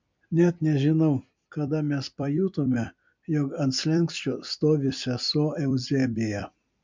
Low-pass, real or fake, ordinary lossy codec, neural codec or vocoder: 7.2 kHz; fake; MP3, 64 kbps; vocoder, 44.1 kHz, 128 mel bands every 256 samples, BigVGAN v2